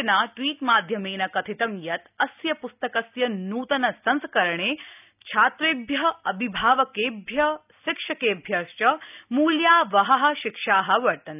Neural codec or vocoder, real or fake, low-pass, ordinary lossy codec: none; real; 3.6 kHz; none